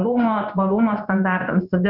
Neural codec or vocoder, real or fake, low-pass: codec, 16 kHz in and 24 kHz out, 1 kbps, XY-Tokenizer; fake; 5.4 kHz